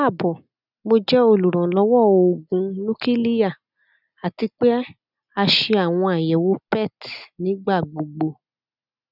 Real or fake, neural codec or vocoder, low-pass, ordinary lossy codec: real; none; 5.4 kHz; none